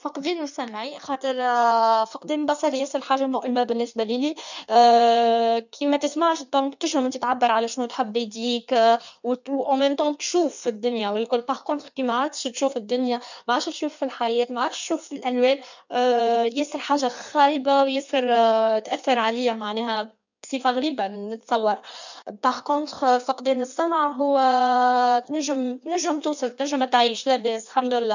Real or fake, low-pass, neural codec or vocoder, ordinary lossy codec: fake; 7.2 kHz; codec, 16 kHz in and 24 kHz out, 1.1 kbps, FireRedTTS-2 codec; none